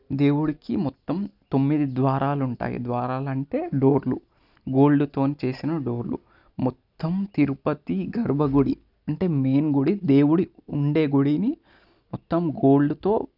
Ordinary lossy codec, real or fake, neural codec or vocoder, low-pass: none; real; none; 5.4 kHz